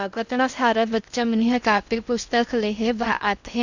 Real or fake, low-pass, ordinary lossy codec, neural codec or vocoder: fake; 7.2 kHz; none; codec, 16 kHz in and 24 kHz out, 0.6 kbps, FocalCodec, streaming, 2048 codes